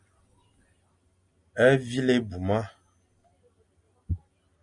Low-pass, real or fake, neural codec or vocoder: 10.8 kHz; real; none